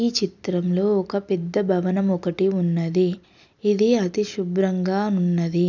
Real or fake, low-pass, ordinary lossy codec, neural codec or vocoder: real; 7.2 kHz; none; none